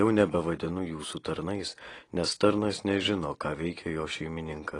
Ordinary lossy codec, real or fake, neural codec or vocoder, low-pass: AAC, 32 kbps; real; none; 10.8 kHz